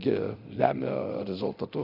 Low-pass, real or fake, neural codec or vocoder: 5.4 kHz; fake; codec, 24 kHz, 0.9 kbps, DualCodec